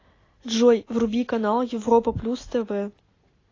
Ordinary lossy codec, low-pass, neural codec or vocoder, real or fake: AAC, 32 kbps; 7.2 kHz; none; real